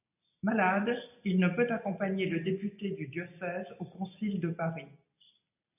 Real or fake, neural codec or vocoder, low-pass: real; none; 3.6 kHz